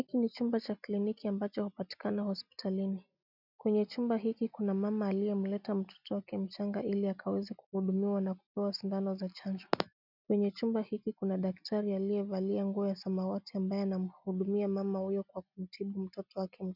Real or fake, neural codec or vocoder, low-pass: real; none; 5.4 kHz